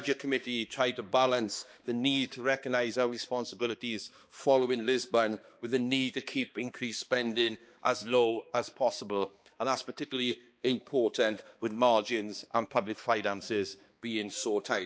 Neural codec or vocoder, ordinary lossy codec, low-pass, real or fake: codec, 16 kHz, 2 kbps, X-Codec, HuBERT features, trained on balanced general audio; none; none; fake